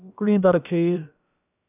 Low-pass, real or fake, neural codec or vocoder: 3.6 kHz; fake; codec, 16 kHz, about 1 kbps, DyCAST, with the encoder's durations